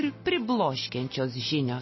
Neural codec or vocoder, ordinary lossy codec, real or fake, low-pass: none; MP3, 24 kbps; real; 7.2 kHz